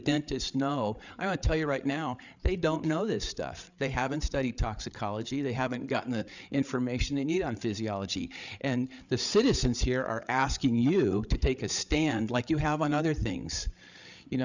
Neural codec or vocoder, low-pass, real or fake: codec, 16 kHz, 8 kbps, FreqCodec, larger model; 7.2 kHz; fake